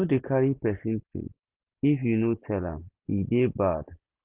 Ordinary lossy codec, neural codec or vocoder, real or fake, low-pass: Opus, 32 kbps; none; real; 3.6 kHz